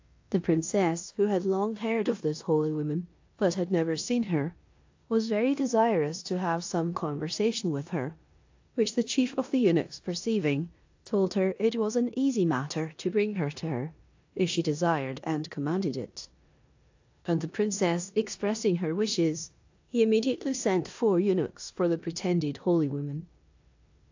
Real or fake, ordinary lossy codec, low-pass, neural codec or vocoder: fake; AAC, 48 kbps; 7.2 kHz; codec, 16 kHz in and 24 kHz out, 0.9 kbps, LongCat-Audio-Codec, four codebook decoder